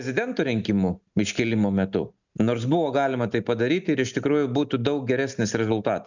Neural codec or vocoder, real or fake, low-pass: none; real; 7.2 kHz